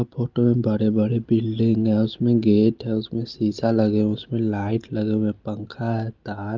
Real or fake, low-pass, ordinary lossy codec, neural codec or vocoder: real; 7.2 kHz; Opus, 32 kbps; none